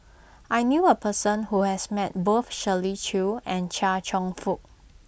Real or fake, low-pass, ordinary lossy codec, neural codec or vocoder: real; none; none; none